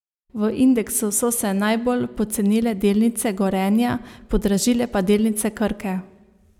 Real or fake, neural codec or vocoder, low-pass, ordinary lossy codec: real; none; 19.8 kHz; none